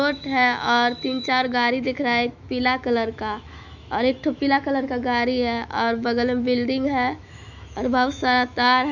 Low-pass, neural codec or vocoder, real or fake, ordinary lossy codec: 7.2 kHz; autoencoder, 48 kHz, 128 numbers a frame, DAC-VAE, trained on Japanese speech; fake; none